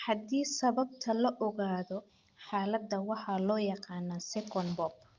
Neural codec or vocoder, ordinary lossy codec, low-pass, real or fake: none; Opus, 24 kbps; 7.2 kHz; real